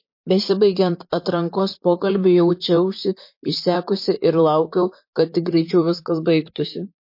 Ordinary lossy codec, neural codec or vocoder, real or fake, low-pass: MP3, 32 kbps; vocoder, 44.1 kHz, 128 mel bands, Pupu-Vocoder; fake; 5.4 kHz